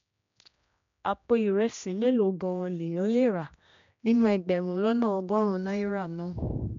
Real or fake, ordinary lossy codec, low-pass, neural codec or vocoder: fake; MP3, 64 kbps; 7.2 kHz; codec, 16 kHz, 1 kbps, X-Codec, HuBERT features, trained on general audio